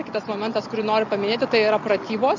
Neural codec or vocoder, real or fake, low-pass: none; real; 7.2 kHz